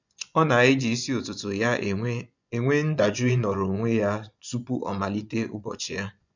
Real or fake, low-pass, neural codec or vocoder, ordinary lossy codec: fake; 7.2 kHz; vocoder, 24 kHz, 100 mel bands, Vocos; none